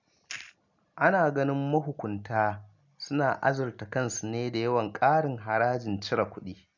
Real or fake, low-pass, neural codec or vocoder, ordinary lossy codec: real; 7.2 kHz; none; none